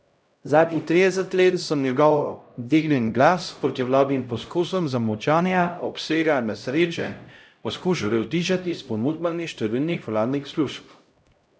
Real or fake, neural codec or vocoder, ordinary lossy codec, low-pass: fake; codec, 16 kHz, 0.5 kbps, X-Codec, HuBERT features, trained on LibriSpeech; none; none